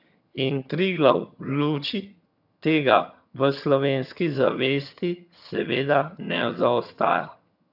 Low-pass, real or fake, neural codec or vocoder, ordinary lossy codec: 5.4 kHz; fake; vocoder, 22.05 kHz, 80 mel bands, HiFi-GAN; none